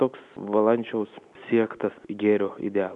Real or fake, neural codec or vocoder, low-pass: real; none; 10.8 kHz